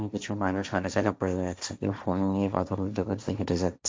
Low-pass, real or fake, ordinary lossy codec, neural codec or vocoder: none; fake; none; codec, 16 kHz, 1.1 kbps, Voila-Tokenizer